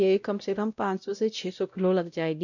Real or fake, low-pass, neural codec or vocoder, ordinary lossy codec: fake; 7.2 kHz; codec, 16 kHz, 0.5 kbps, X-Codec, WavLM features, trained on Multilingual LibriSpeech; none